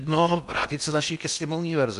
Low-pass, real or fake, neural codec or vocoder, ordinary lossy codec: 10.8 kHz; fake; codec, 16 kHz in and 24 kHz out, 0.8 kbps, FocalCodec, streaming, 65536 codes; AAC, 96 kbps